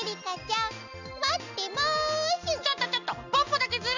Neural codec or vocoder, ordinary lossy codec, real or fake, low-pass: none; none; real; 7.2 kHz